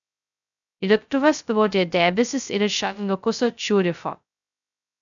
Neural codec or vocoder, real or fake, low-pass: codec, 16 kHz, 0.2 kbps, FocalCodec; fake; 7.2 kHz